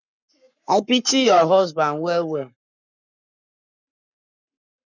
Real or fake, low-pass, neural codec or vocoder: fake; 7.2 kHz; codec, 44.1 kHz, 7.8 kbps, Pupu-Codec